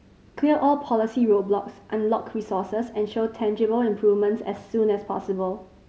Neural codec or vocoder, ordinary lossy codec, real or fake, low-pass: none; none; real; none